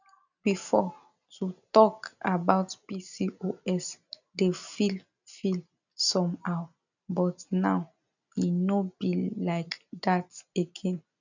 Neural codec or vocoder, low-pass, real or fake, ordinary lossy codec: none; 7.2 kHz; real; none